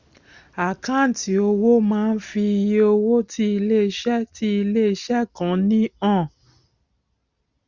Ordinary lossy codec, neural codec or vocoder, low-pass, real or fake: Opus, 64 kbps; none; 7.2 kHz; real